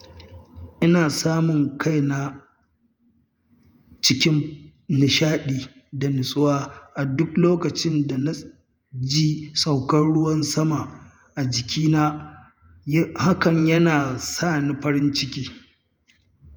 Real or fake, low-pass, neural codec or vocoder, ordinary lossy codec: fake; none; vocoder, 48 kHz, 128 mel bands, Vocos; none